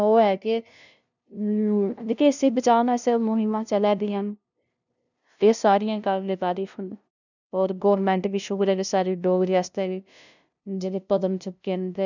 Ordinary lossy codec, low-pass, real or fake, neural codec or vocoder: none; 7.2 kHz; fake; codec, 16 kHz, 0.5 kbps, FunCodec, trained on LibriTTS, 25 frames a second